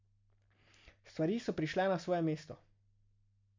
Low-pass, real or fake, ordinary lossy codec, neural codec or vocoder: 7.2 kHz; real; MP3, 64 kbps; none